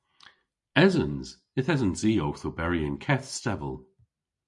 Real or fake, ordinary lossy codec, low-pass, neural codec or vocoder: real; MP3, 48 kbps; 10.8 kHz; none